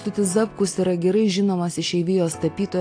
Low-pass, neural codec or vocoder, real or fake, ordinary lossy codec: 9.9 kHz; none; real; AAC, 48 kbps